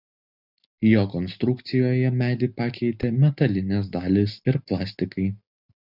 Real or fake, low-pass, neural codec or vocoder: real; 5.4 kHz; none